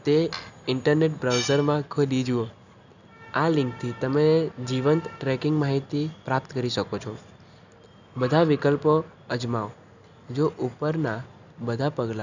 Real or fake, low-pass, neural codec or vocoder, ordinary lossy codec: real; 7.2 kHz; none; none